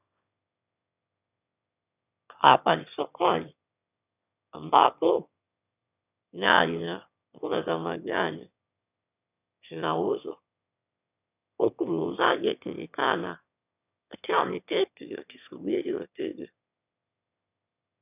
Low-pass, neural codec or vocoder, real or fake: 3.6 kHz; autoencoder, 22.05 kHz, a latent of 192 numbers a frame, VITS, trained on one speaker; fake